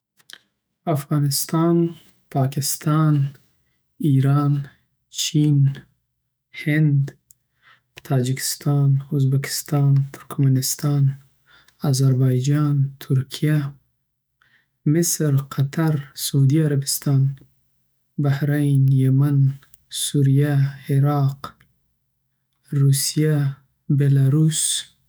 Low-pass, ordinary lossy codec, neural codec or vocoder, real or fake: none; none; autoencoder, 48 kHz, 128 numbers a frame, DAC-VAE, trained on Japanese speech; fake